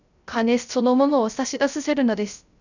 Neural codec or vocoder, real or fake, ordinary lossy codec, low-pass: codec, 16 kHz, 0.3 kbps, FocalCodec; fake; none; 7.2 kHz